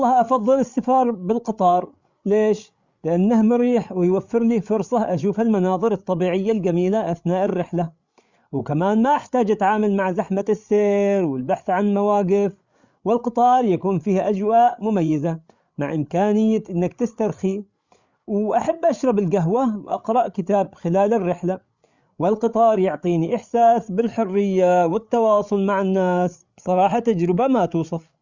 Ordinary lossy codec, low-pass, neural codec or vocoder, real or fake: Opus, 64 kbps; 7.2 kHz; codec, 16 kHz, 8 kbps, FreqCodec, larger model; fake